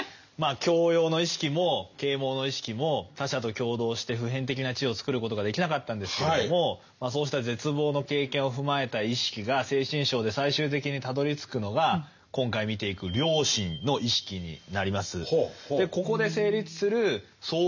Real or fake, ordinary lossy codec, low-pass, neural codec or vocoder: real; none; 7.2 kHz; none